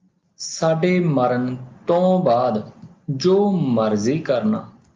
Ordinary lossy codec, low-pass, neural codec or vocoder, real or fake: Opus, 24 kbps; 7.2 kHz; none; real